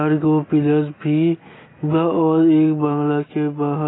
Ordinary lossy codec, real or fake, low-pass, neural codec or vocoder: AAC, 16 kbps; real; 7.2 kHz; none